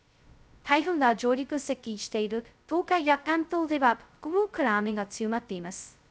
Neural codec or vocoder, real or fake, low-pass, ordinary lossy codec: codec, 16 kHz, 0.2 kbps, FocalCodec; fake; none; none